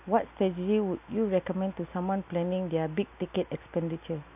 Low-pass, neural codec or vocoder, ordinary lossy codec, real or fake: 3.6 kHz; none; AAC, 32 kbps; real